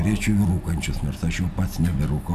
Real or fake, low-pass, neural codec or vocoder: real; 14.4 kHz; none